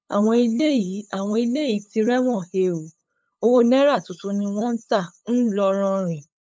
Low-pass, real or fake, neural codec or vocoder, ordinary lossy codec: none; fake; codec, 16 kHz, 8 kbps, FunCodec, trained on LibriTTS, 25 frames a second; none